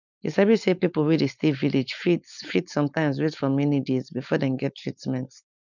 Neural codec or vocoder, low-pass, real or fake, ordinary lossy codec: codec, 16 kHz, 4.8 kbps, FACodec; 7.2 kHz; fake; none